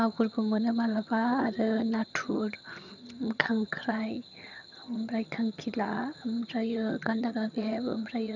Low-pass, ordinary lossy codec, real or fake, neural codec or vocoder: 7.2 kHz; none; fake; vocoder, 22.05 kHz, 80 mel bands, HiFi-GAN